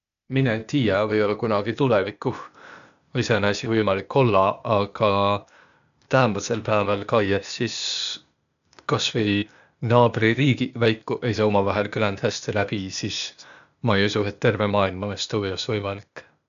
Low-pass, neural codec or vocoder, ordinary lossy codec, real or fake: 7.2 kHz; codec, 16 kHz, 0.8 kbps, ZipCodec; AAC, 96 kbps; fake